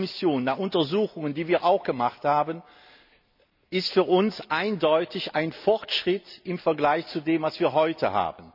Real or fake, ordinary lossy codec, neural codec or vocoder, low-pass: real; none; none; 5.4 kHz